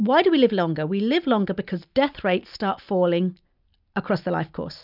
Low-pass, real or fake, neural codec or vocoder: 5.4 kHz; real; none